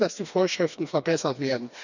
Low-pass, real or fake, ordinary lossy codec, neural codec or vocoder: 7.2 kHz; fake; none; codec, 16 kHz, 2 kbps, FreqCodec, smaller model